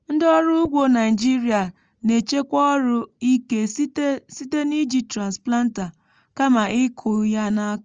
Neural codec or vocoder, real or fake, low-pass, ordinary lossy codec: none; real; 7.2 kHz; Opus, 24 kbps